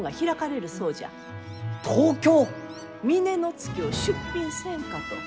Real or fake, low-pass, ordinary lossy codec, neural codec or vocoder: real; none; none; none